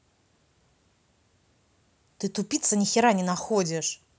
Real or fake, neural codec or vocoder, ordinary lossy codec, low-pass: real; none; none; none